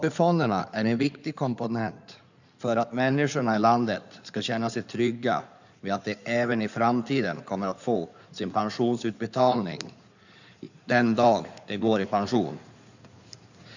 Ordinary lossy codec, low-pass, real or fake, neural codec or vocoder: none; 7.2 kHz; fake; codec, 16 kHz in and 24 kHz out, 2.2 kbps, FireRedTTS-2 codec